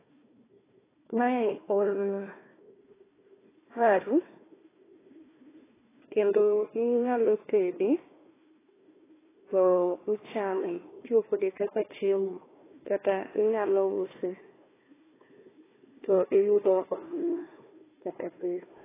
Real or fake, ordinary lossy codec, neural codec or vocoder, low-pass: fake; AAC, 16 kbps; codec, 16 kHz, 1 kbps, FunCodec, trained on Chinese and English, 50 frames a second; 3.6 kHz